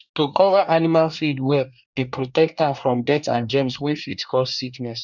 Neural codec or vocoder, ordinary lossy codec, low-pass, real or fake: codec, 24 kHz, 1 kbps, SNAC; none; 7.2 kHz; fake